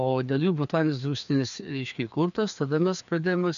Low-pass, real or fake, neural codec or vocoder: 7.2 kHz; fake; codec, 16 kHz, 2 kbps, FreqCodec, larger model